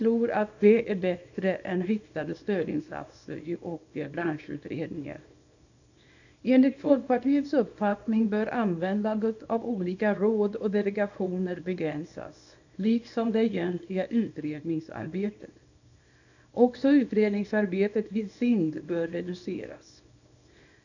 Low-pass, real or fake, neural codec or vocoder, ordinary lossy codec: 7.2 kHz; fake; codec, 24 kHz, 0.9 kbps, WavTokenizer, small release; none